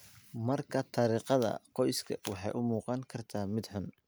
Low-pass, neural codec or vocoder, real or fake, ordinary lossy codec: none; none; real; none